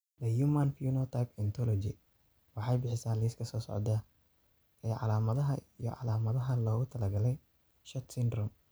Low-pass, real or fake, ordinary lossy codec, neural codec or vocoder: none; real; none; none